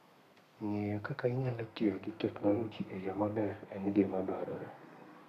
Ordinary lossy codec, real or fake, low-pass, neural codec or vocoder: none; fake; 14.4 kHz; codec, 32 kHz, 1.9 kbps, SNAC